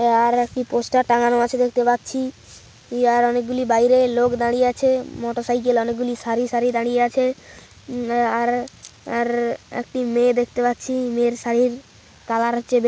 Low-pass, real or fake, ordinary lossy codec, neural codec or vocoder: none; real; none; none